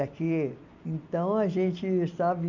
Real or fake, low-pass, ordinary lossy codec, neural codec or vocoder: real; 7.2 kHz; none; none